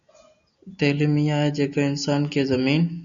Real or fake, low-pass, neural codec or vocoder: real; 7.2 kHz; none